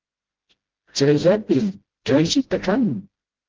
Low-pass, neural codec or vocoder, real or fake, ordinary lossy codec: 7.2 kHz; codec, 16 kHz, 0.5 kbps, FreqCodec, smaller model; fake; Opus, 16 kbps